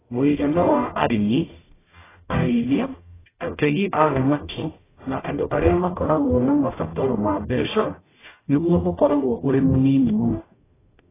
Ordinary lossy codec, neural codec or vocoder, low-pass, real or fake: AAC, 16 kbps; codec, 44.1 kHz, 0.9 kbps, DAC; 3.6 kHz; fake